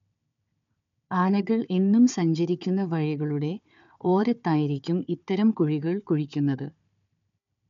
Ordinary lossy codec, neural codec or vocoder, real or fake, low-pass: MP3, 64 kbps; codec, 16 kHz, 4 kbps, FunCodec, trained on Chinese and English, 50 frames a second; fake; 7.2 kHz